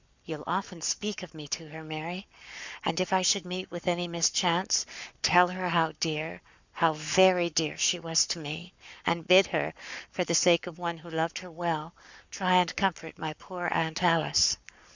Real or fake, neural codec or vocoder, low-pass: fake; codec, 44.1 kHz, 7.8 kbps, Pupu-Codec; 7.2 kHz